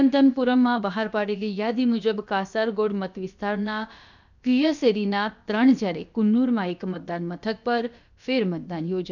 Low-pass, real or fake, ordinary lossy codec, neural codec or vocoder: 7.2 kHz; fake; none; codec, 16 kHz, about 1 kbps, DyCAST, with the encoder's durations